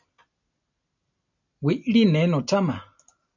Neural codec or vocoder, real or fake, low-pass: none; real; 7.2 kHz